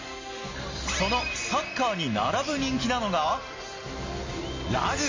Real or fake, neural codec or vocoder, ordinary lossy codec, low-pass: real; none; MP3, 32 kbps; 7.2 kHz